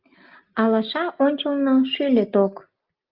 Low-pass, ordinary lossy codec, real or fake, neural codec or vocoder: 5.4 kHz; Opus, 32 kbps; real; none